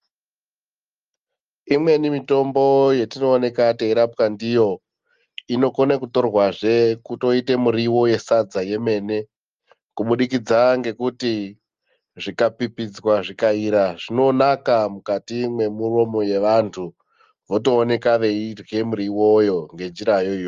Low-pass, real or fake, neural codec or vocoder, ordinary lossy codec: 7.2 kHz; real; none; Opus, 32 kbps